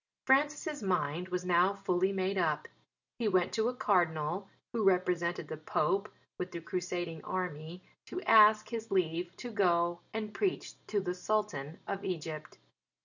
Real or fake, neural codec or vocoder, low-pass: real; none; 7.2 kHz